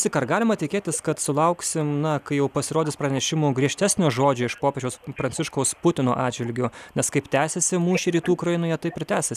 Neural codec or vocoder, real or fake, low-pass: none; real; 14.4 kHz